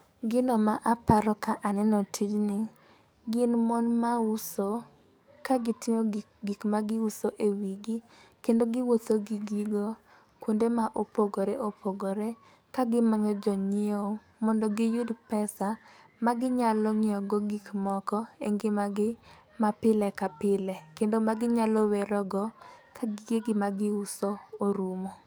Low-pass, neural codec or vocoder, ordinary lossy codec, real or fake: none; codec, 44.1 kHz, 7.8 kbps, DAC; none; fake